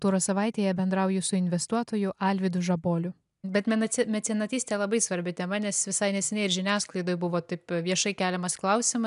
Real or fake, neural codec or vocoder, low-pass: real; none; 10.8 kHz